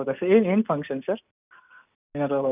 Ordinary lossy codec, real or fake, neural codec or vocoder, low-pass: none; real; none; 3.6 kHz